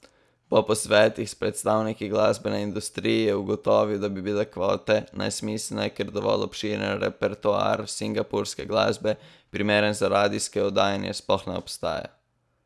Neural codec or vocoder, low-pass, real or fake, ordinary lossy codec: none; none; real; none